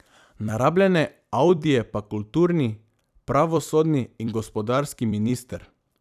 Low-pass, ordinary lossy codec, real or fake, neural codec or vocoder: 14.4 kHz; none; fake; vocoder, 44.1 kHz, 128 mel bands every 256 samples, BigVGAN v2